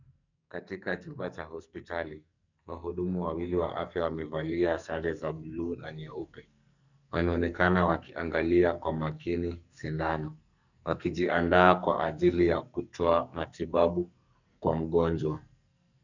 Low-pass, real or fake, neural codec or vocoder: 7.2 kHz; fake; codec, 44.1 kHz, 2.6 kbps, SNAC